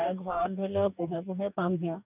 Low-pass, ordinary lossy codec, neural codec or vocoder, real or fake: 3.6 kHz; none; codec, 44.1 kHz, 2.6 kbps, DAC; fake